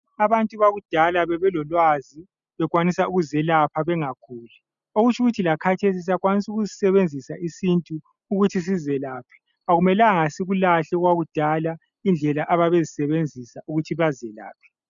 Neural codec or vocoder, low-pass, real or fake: none; 7.2 kHz; real